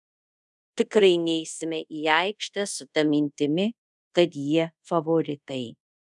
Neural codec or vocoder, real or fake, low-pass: codec, 24 kHz, 0.5 kbps, DualCodec; fake; 10.8 kHz